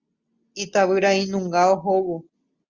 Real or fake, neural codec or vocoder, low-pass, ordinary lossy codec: real; none; 7.2 kHz; Opus, 64 kbps